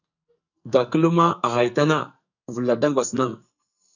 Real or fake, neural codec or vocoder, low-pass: fake; codec, 44.1 kHz, 2.6 kbps, SNAC; 7.2 kHz